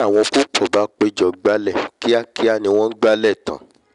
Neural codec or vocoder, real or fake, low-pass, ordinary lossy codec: none; real; 10.8 kHz; none